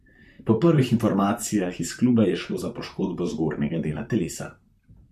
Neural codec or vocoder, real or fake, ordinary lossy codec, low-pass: vocoder, 44.1 kHz, 128 mel bands, Pupu-Vocoder; fake; MP3, 64 kbps; 14.4 kHz